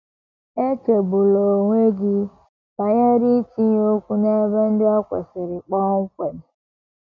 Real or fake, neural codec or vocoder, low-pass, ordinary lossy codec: real; none; 7.2 kHz; none